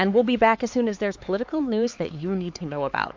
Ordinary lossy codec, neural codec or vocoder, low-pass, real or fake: MP3, 48 kbps; codec, 16 kHz, 4 kbps, X-Codec, HuBERT features, trained on LibriSpeech; 7.2 kHz; fake